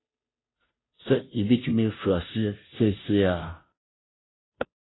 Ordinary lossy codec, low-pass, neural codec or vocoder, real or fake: AAC, 16 kbps; 7.2 kHz; codec, 16 kHz, 0.5 kbps, FunCodec, trained on Chinese and English, 25 frames a second; fake